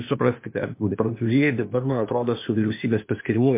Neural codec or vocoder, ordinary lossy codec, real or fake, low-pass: codec, 16 kHz, 0.8 kbps, ZipCodec; MP3, 24 kbps; fake; 3.6 kHz